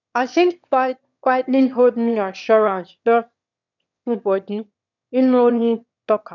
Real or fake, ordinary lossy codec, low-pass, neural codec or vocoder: fake; none; 7.2 kHz; autoencoder, 22.05 kHz, a latent of 192 numbers a frame, VITS, trained on one speaker